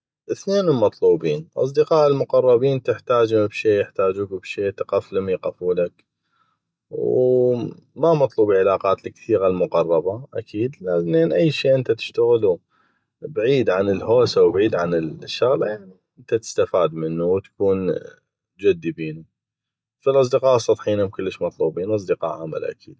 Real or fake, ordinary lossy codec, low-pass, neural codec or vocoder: real; none; none; none